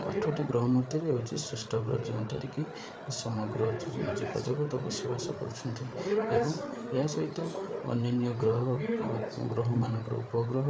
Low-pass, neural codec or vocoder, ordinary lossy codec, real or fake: none; codec, 16 kHz, 8 kbps, FreqCodec, larger model; none; fake